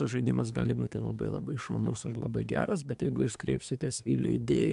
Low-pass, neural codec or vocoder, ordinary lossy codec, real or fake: 10.8 kHz; codec, 24 kHz, 1 kbps, SNAC; AAC, 96 kbps; fake